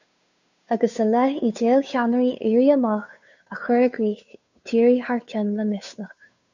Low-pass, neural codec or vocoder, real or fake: 7.2 kHz; codec, 16 kHz, 2 kbps, FunCodec, trained on Chinese and English, 25 frames a second; fake